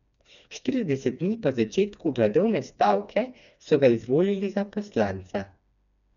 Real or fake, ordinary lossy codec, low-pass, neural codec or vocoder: fake; none; 7.2 kHz; codec, 16 kHz, 2 kbps, FreqCodec, smaller model